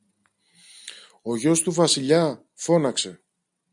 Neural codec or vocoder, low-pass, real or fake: none; 10.8 kHz; real